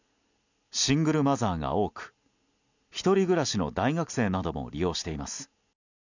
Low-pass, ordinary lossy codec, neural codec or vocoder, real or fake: 7.2 kHz; none; none; real